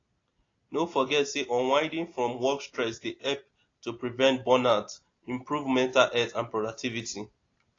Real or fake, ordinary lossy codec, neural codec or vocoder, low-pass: real; AAC, 32 kbps; none; 7.2 kHz